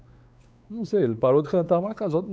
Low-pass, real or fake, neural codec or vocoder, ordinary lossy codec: none; fake; codec, 16 kHz, 4 kbps, X-Codec, WavLM features, trained on Multilingual LibriSpeech; none